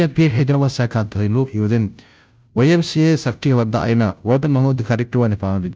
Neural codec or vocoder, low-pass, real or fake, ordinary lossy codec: codec, 16 kHz, 0.5 kbps, FunCodec, trained on Chinese and English, 25 frames a second; none; fake; none